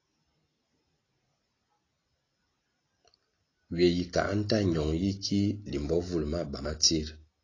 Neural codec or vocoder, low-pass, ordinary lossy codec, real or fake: none; 7.2 kHz; AAC, 32 kbps; real